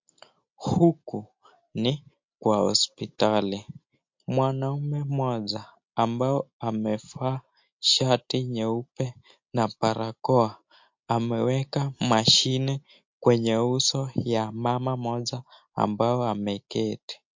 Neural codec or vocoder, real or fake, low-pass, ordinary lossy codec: none; real; 7.2 kHz; MP3, 48 kbps